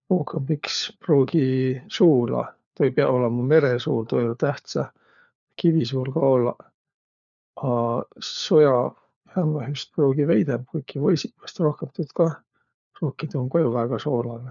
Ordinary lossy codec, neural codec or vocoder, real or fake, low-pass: none; codec, 16 kHz, 4 kbps, FunCodec, trained on LibriTTS, 50 frames a second; fake; 7.2 kHz